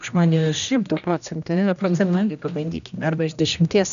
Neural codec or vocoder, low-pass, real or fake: codec, 16 kHz, 1 kbps, X-Codec, HuBERT features, trained on general audio; 7.2 kHz; fake